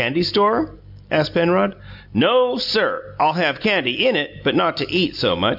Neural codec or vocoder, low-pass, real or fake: none; 5.4 kHz; real